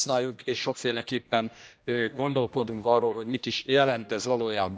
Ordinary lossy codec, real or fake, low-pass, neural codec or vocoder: none; fake; none; codec, 16 kHz, 1 kbps, X-Codec, HuBERT features, trained on general audio